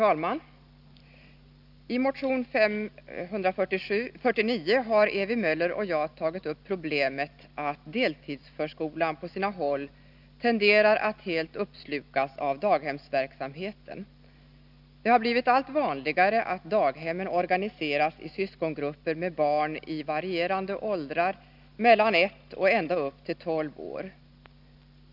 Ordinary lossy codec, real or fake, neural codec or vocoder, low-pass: none; real; none; 5.4 kHz